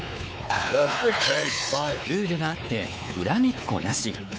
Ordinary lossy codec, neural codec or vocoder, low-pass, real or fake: none; codec, 16 kHz, 4 kbps, X-Codec, WavLM features, trained on Multilingual LibriSpeech; none; fake